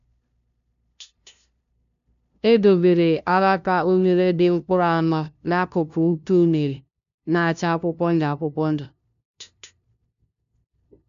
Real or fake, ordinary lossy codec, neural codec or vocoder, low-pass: fake; none; codec, 16 kHz, 0.5 kbps, FunCodec, trained on LibriTTS, 25 frames a second; 7.2 kHz